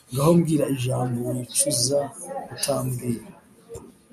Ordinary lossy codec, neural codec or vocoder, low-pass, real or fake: AAC, 96 kbps; none; 14.4 kHz; real